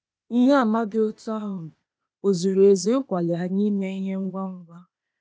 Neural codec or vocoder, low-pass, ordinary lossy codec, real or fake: codec, 16 kHz, 0.8 kbps, ZipCodec; none; none; fake